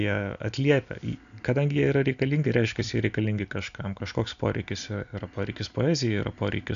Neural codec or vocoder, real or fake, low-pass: none; real; 7.2 kHz